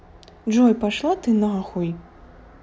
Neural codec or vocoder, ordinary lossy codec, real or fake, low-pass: none; none; real; none